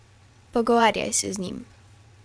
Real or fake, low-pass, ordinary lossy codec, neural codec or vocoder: fake; none; none; vocoder, 22.05 kHz, 80 mel bands, WaveNeXt